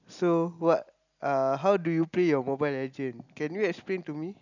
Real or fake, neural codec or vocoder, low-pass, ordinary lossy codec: real; none; 7.2 kHz; none